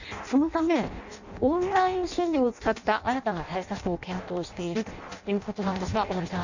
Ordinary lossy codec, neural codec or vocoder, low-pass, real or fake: none; codec, 16 kHz in and 24 kHz out, 0.6 kbps, FireRedTTS-2 codec; 7.2 kHz; fake